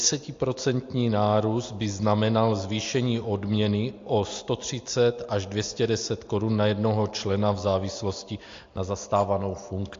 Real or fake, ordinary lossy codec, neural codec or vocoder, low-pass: real; AAC, 48 kbps; none; 7.2 kHz